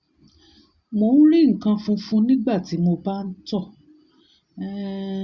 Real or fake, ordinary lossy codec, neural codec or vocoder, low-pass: real; Opus, 64 kbps; none; 7.2 kHz